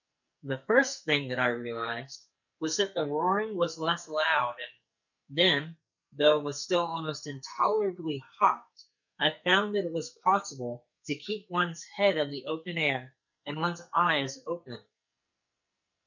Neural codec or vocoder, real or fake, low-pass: codec, 44.1 kHz, 2.6 kbps, SNAC; fake; 7.2 kHz